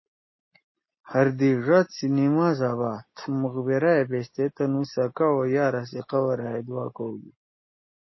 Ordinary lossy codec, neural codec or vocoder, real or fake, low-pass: MP3, 24 kbps; none; real; 7.2 kHz